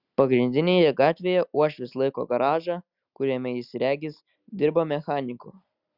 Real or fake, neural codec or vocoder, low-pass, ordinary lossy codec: real; none; 5.4 kHz; Opus, 64 kbps